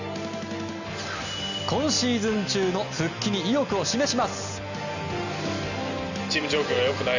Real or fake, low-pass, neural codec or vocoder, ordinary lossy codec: real; 7.2 kHz; none; none